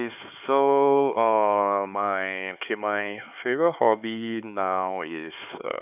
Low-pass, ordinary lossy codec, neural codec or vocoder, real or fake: 3.6 kHz; none; codec, 16 kHz, 4 kbps, X-Codec, HuBERT features, trained on LibriSpeech; fake